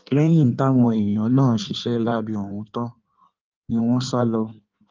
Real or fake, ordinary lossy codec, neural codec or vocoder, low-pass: fake; Opus, 24 kbps; codec, 16 kHz in and 24 kHz out, 1.1 kbps, FireRedTTS-2 codec; 7.2 kHz